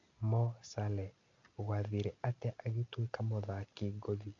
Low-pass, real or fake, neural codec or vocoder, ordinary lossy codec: 7.2 kHz; real; none; AAC, 48 kbps